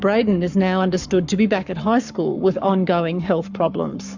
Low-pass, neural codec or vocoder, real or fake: 7.2 kHz; codec, 44.1 kHz, 7.8 kbps, Pupu-Codec; fake